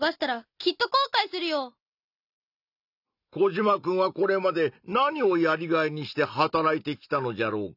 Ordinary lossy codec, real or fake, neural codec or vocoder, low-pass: none; real; none; 5.4 kHz